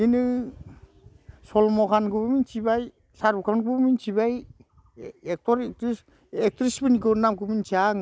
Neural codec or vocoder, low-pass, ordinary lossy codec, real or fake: none; none; none; real